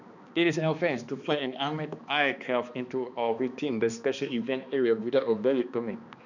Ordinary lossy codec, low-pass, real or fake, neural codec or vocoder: none; 7.2 kHz; fake; codec, 16 kHz, 2 kbps, X-Codec, HuBERT features, trained on balanced general audio